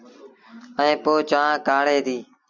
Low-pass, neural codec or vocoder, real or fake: 7.2 kHz; none; real